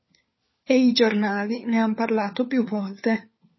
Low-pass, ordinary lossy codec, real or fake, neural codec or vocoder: 7.2 kHz; MP3, 24 kbps; fake; codec, 16 kHz, 4 kbps, FunCodec, trained on LibriTTS, 50 frames a second